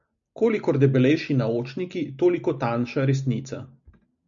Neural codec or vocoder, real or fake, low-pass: none; real; 7.2 kHz